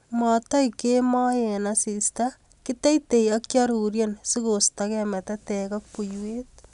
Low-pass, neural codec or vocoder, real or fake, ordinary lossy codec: 10.8 kHz; none; real; none